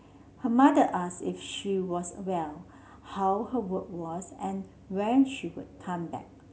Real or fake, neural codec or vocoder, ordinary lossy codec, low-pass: real; none; none; none